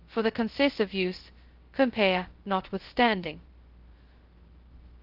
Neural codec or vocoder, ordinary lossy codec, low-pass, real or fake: codec, 16 kHz, 0.2 kbps, FocalCodec; Opus, 16 kbps; 5.4 kHz; fake